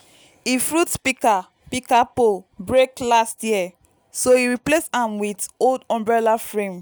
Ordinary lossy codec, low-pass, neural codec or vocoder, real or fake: none; none; none; real